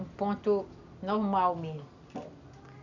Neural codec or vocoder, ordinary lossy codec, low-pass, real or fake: none; none; 7.2 kHz; real